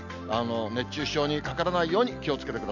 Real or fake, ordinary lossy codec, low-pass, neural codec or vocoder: real; none; 7.2 kHz; none